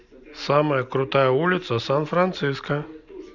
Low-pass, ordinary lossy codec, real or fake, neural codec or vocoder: 7.2 kHz; none; real; none